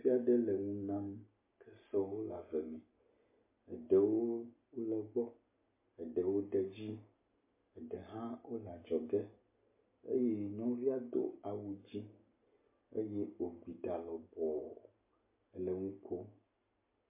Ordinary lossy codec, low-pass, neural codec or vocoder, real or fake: MP3, 24 kbps; 3.6 kHz; none; real